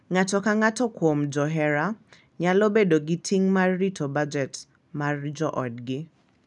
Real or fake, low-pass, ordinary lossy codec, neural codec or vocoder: real; 10.8 kHz; none; none